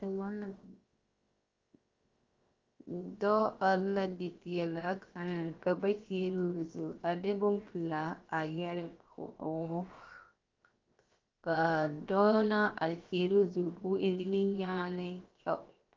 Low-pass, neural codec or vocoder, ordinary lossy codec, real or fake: 7.2 kHz; codec, 16 kHz, 0.7 kbps, FocalCodec; Opus, 32 kbps; fake